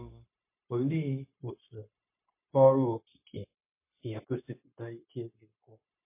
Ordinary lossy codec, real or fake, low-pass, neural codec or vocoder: MP3, 24 kbps; fake; 3.6 kHz; codec, 16 kHz, 0.9 kbps, LongCat-Audio-Codec